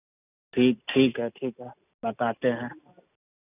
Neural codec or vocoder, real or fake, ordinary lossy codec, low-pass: none; real; none; 3.6 kHz